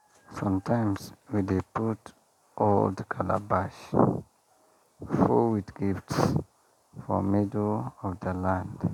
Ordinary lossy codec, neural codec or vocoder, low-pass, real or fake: none; none; 19.8 kHz; real